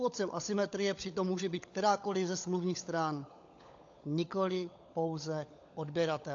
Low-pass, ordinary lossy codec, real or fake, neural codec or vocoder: 7.2 kHz; AAC, 48 kbps; fake; codec, 16 kHz, 16 kbps, FunCodec, trained on LibriTTS, 50 frames a second